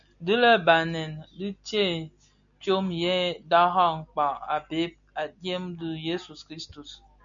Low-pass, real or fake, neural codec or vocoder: 7.2 kHz; real; none